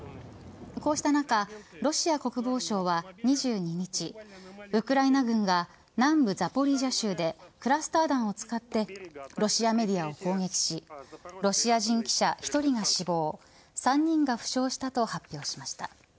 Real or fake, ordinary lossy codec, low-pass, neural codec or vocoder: real; none; none; none